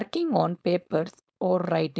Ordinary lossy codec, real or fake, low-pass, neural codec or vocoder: none; fake; none; codec, 16 kHz, 4.8 kbps, FACodec